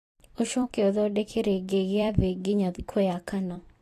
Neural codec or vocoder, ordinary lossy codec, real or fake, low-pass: vocoder, 44.1 kHz, 128 mel bands every 256 samples, BigVGAN v2; AAC, 48 kbps; fake; 14.4 kHz